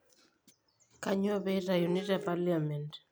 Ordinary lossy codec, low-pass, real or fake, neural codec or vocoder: none; none; real; none